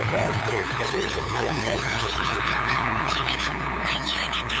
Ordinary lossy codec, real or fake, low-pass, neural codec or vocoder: none; fake; none; codec, 16 kHz, 2 kbps, FunCodec, trained on LibriTTS, 25 frames a second